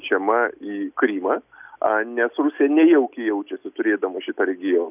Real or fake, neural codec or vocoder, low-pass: real; none; 3.6 kHz